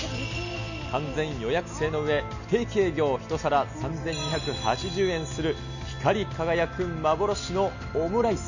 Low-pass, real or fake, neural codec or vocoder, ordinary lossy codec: 7.2 kHz; real; none; none